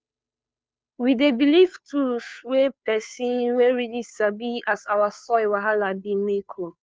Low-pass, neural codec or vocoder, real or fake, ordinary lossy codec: none; codec, 16 kHz, 2 kbps, FunCodec, trained on Chinese and English, 25 frames a second; fake; none